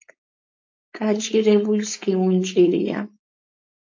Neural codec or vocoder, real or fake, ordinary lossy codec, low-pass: codec, 16 kHz, 4.8 kbps, FACodec; fake; AAC, 48 kbps; 7.2 kHz